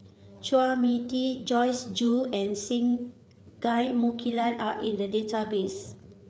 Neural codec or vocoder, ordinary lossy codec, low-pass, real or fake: codec, 16 kHz, 4 kbps, FreqCodec, larger model; none; none; fake